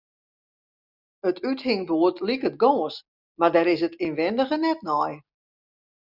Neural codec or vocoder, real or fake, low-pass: none; real; 5.4 kHz